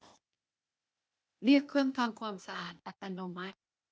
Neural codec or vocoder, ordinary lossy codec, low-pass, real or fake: codec, 16 kHz, 0.8 kbps, ZipCodec; none; none; fake